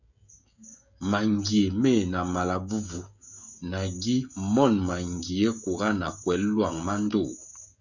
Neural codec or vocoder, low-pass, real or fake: codec, 16 kHz, 16 kbps, FreqCodec, smaller model; 7.2 kHz; fake